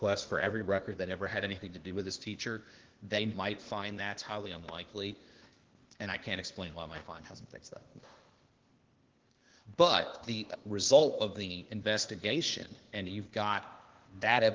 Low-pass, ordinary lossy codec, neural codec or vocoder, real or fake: 7.2 kHz; Opus, 16 kbps; codec, 16 kHz, 0.8 kbps, ZipCodec; fake